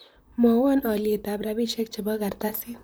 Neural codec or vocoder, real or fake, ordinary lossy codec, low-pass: vocoder, 44.1 kHz, 128 mel bands, Pupu-Vocoder; fake; none; none